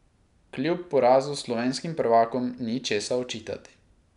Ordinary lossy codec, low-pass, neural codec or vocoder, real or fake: none; 10.8 kHz; none; real